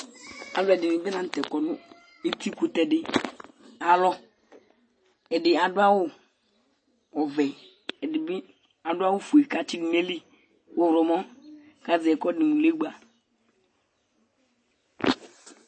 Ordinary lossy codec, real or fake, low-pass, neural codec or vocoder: MP3, 32 kbps; real; 9.9 kHz; none